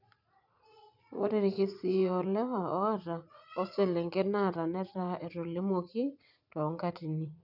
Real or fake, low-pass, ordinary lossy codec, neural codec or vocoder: fake; 5.4 kHz; none; vocoder, 44.1 kHz, 80 mel bands, Vocos